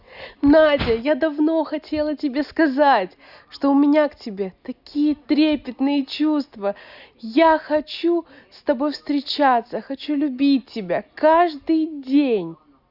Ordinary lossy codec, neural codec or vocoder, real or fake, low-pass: Opus, 64 kbps; none; real; 5.4 kHz